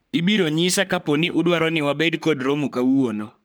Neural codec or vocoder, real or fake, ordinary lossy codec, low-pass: codec, 44.1 kHz, 3.4 kbps, Pupu-Codec; fake; none; none